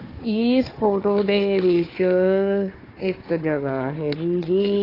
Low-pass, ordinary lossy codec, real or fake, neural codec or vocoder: 5.4 kHz; AAC, 24 kbps; fake; codec, 16 kHz, 4 kbps, FunCodec, trained on Chinese and English, 50 frames a second